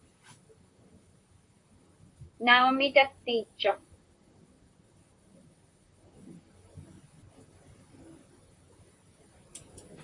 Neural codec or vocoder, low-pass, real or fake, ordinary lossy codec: vocoder, 44.1 kHz, 128 mel bands, Pupu-Vocoder; 10.8 kHz; fake; AAC, 64 kbps